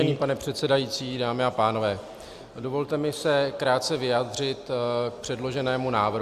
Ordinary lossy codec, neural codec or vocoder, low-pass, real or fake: Opus, 64 kbps; none; 14.4 kHz; real